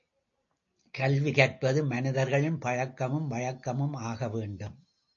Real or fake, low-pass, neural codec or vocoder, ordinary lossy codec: real; 7.2 kHz; none; AAC, 48 kbps